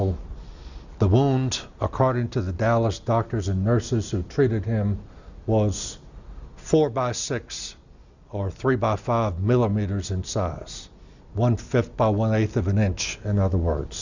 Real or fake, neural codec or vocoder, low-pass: real; none; 7.2 kHz